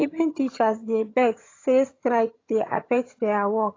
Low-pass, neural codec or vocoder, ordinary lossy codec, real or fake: 7.2 kHz; vocoder, 22.05 kHz, 80 mel bands, HiFi-GAN; AAC, 32 kbps; fake